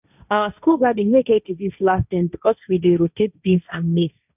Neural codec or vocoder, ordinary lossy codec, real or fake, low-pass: codec, 16 kHz, 1.1 kbps, Voila-Tokenizer; none; fake; 3.6 kHz